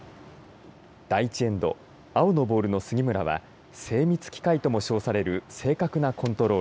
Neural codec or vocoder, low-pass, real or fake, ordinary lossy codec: none; none; real; none